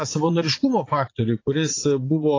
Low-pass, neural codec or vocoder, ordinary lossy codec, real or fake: 7.2 kHz; vocoder, 44.1 kHz, 80 mel bands, Vocos; AAC, 32 kbps; fake